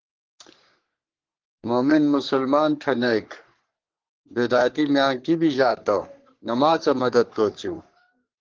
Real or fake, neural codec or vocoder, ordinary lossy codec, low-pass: fake; codec, 44.1 kHz, 3.4 kbps, Pupu-Codec; Opus, 16 kbps; 7.2 kHz